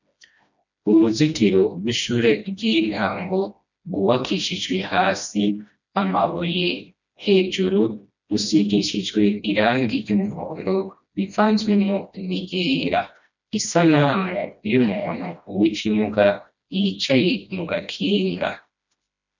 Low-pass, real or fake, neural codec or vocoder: 7.2 kHz; fake; codec, 16 kHz, 1 kbps, FreqCodec, smaller model